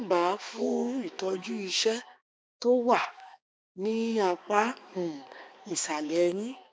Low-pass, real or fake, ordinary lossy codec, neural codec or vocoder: none; fake; none; codec, 16 kHz, 2 kbps, X-Codec, HuBERT features, trained on balanced general audio